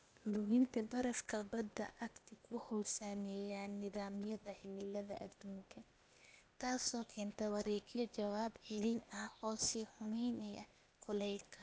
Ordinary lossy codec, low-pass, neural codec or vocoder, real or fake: none; none; codec, 16 kHz, 0.8 kbps, ZipCodec; fake